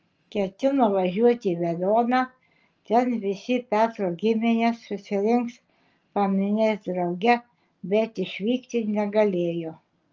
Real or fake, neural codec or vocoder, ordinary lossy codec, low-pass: real; none; Opus, 24 kbps; 7.2 kHz